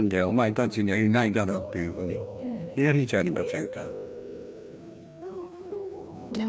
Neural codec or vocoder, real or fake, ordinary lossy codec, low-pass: codec, 16 kHz, 1 kbps, FreqCodec, larger model; fake; none; none